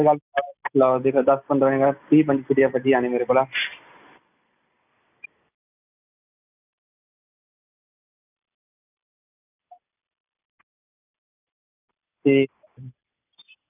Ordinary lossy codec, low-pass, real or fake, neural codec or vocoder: none; 3.6 kHz; real; none